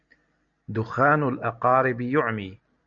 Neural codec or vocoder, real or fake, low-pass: none; real; 7.2 kHz